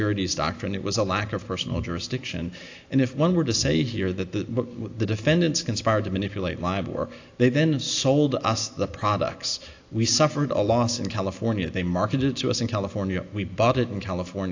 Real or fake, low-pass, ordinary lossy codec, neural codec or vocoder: real; 7.2 kHz; AAC, 48 kbps; none